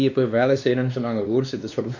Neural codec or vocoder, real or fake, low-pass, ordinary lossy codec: codec, 16 kHz, 2 kbps, X-Codec, WavLM features, trained on Multilingual LibriSpeech; fake; 7.2 kHz; none